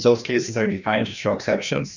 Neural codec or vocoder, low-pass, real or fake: codec, 16 kHz, 1 kbps, FreqCodec, larger model; 7.2 kHz; fake